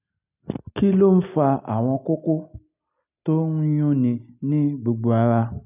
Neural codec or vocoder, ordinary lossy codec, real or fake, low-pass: none; none; real; 3.6 kHz